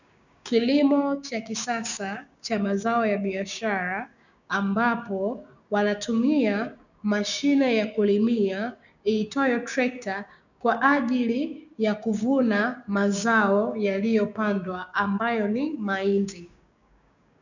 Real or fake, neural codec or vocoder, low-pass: fake; codec, 16 kHz, 6 kbps, DAC; 7.2 kHz